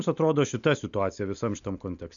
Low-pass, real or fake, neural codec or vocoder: 7.2 kHz; real; none